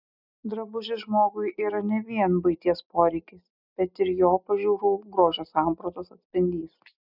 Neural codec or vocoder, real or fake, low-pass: none; real; 5.4 kHz